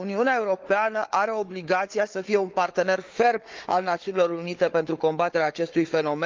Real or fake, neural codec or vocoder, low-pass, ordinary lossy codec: fake; codec, 16 kHz, 4 kbps, FunCodec, trained on Chinese and English, 50 frames a second; 7.2 kHz; Opus, 24 kbps